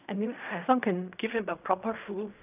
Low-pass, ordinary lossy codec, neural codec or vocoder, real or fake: 3.6 kHz; none; codec, 16 kHz in and 24 kHz out, 0.4 kbps, LongCat-Audio-Codec, fine tuned four codebook decoder; fake